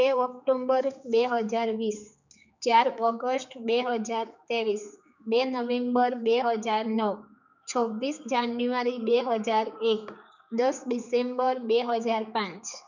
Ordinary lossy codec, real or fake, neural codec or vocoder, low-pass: none; fake; codec, 16 kHz, 4 kbps, X-Codec, HuBERT features, trained on general audio; 7.2 kHz